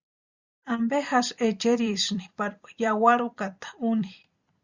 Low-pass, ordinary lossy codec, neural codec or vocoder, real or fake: 7.2 kHz; Opus, 64 kbps; vocoder, 44.1 kHz, 128 mel bands every 256 samples, BigVGAN v2; fake